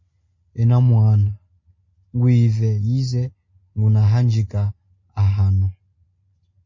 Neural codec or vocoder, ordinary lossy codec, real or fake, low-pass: none; MP3, 32 kbps; real; 7.2 kHz